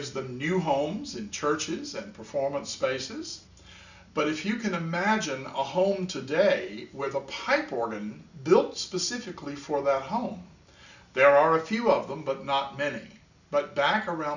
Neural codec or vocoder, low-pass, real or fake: none; 7.2 kHz; real